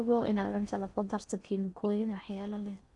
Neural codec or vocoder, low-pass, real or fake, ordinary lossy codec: codec, 16 kHz in and 24 kHz out, 0.6 kbps, FocalCodec, streaming, 4096 codes; 10.8 kHz; fake; none